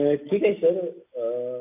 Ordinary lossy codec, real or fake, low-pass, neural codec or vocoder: none; real; 3.6 kHz; none